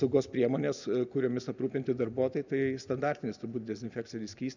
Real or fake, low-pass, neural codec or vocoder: real; 7.2 kHz; none